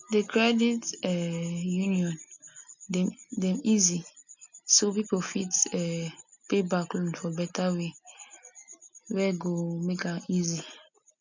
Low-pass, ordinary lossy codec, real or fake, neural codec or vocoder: 7.2 kHz; none; real; none